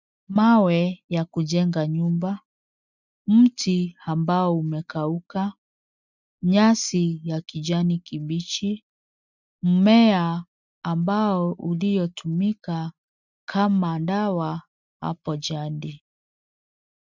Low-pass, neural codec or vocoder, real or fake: 7.2 kHz; none; real